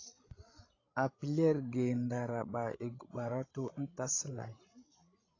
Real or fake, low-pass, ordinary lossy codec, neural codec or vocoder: fake; 7.2 kHz; AAC, 32 kbps; codec, 16 kHz, 16 kbps, FreqCodec, larger model